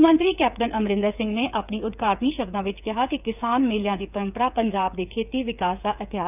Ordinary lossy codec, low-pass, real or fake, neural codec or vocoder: none; 3.6 kHz; fake; codec, 16 kHz, 8 kbps, FreqCodec, smaller model